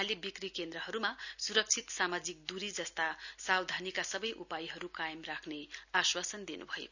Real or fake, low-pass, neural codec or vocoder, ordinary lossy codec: real; 7.2 kHz; none; none